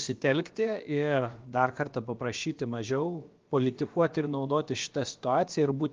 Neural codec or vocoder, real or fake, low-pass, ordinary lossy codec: codec, 16 kHz, about 1 kbps, DyCAST, with the encoder's durations; fake; 7.2 kHz; Opus, 32 kbps